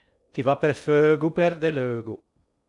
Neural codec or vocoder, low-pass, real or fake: codec, 16 kHz in and 24 kHz out, 0.6 kbps, FocalCodec, streaming, 2048 codes; 10.8 kHz; fake